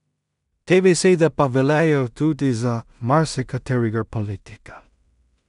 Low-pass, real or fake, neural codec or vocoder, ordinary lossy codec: 10.8 kHz; fake; codec, 16 kHz in and 24 kHz out, 0.4 kbps, LongCat-Audio-Codec, two codebook decoder; none